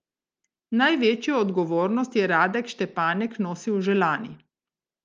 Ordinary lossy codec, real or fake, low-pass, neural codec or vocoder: Opus, 24 kbps; real; 7.2 kHz; none